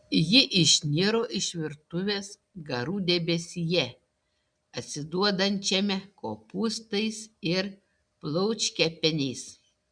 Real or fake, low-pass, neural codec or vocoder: real; 9.9 kHz; none